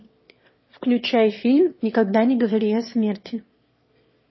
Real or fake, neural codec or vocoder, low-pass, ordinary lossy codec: fake; autoencoder, 22.05 kHz, a latent of 192 numbers a frame, VITS, trained on one speaker; 7.2 kHz; MP3, 24 kbps